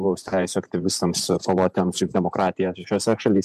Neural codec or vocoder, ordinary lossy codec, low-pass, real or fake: vocoder, 48 kHz, 128 mel bands, Vocos; MP3, 96 kbps; 14.4 kHz; fake